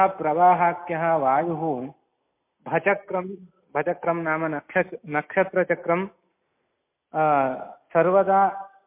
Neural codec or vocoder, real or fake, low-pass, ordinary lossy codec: none; real; 3.6 kHz; MP3, 24 kbps